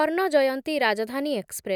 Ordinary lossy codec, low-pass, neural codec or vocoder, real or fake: none; 19.8 kHz; none; real